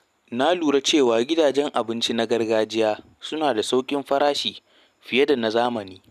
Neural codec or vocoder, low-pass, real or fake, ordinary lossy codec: none; 14.4 kHz; real; none